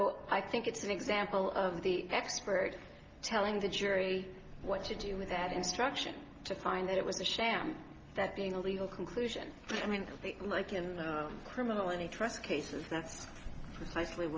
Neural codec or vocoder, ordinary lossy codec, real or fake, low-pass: none; Opus, 24 kbps; real; 7.2 kHz